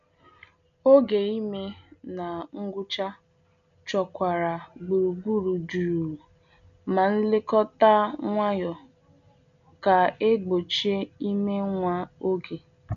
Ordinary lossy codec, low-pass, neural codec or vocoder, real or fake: none; 7.2 kHz; none; real